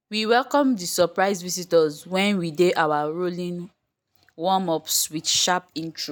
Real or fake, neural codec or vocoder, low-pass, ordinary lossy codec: real; none; none; none